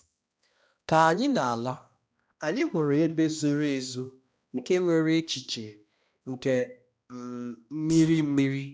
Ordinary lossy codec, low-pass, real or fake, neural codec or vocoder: none; none; fake; codec, 16 kHz, 1 kbps, X-Codec, HuBERT features, trained on balanced general audio